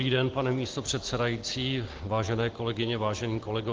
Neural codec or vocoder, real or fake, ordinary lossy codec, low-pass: none; real; Opus, 16 kbps; 7.2 kHz